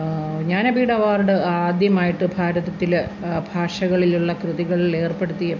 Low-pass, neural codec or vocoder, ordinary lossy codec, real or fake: 7.2 kHz; none; none; real